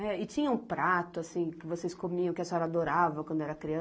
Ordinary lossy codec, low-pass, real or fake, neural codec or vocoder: none; none; real; none